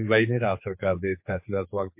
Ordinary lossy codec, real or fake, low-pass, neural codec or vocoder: MP3, 32 kbps; fake; 3.6 kHz; codec, 16 kHz, 4 kbps, X-Codec, HuBERT features, trained on general audio